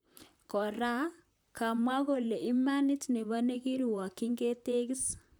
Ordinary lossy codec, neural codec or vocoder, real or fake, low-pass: none; vocoder, 44.1 kHz, 128 mel bands, Pupu-Vocoder; fake; none